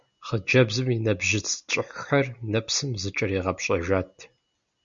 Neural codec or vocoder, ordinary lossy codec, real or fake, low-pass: none; Opus, 64 kbps; real; 7.2 kHz